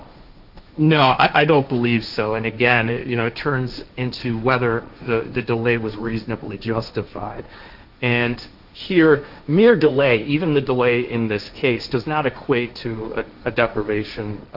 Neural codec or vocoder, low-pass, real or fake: codec, 16 kHz, 1.1 kbps, Voila-Tokenizer; 5.4 kHz; fake